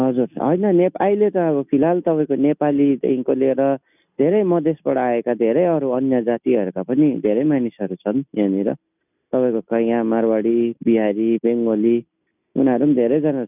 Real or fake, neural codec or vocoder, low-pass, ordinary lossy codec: real; none; 3.6 kHz; none